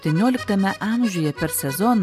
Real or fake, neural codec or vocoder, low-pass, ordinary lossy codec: real; none; 14.4 kHz; AAC, 96 kbps